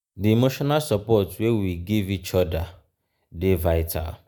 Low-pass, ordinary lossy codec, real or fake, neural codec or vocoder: none; none; real; none